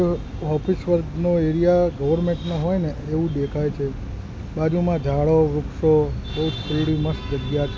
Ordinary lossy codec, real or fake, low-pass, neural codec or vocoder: none; real; none; none